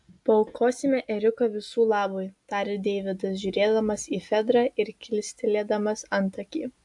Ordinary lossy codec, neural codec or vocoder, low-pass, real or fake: AAC, 64 kbps; none; 10.8 kHz; real